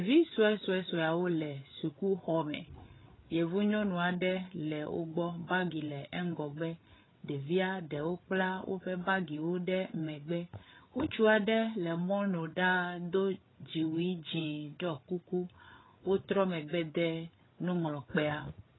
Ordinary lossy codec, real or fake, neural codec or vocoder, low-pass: AAC, 16 kbps; fake; codec, 16 kHz, 8 kbps, FreqCodec, larger model; 7.2 kHz